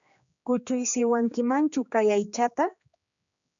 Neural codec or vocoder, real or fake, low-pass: codec, 16 kHz, 2 kbps, X-Codec, HuBERT features, trained on general audio; fake; 7.2 kHz